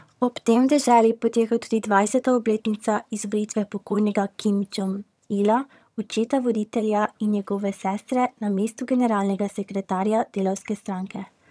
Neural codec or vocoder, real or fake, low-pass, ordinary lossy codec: vocoder, 22.05 kHz, 80 mel bands, HiFi-GAN; fake; none; none